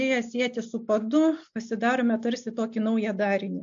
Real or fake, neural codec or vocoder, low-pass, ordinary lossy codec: real; none; 7.2 kHz; AAC, 64 kbps